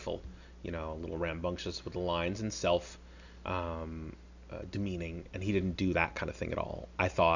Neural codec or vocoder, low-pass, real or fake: none; 7.2 kHz; real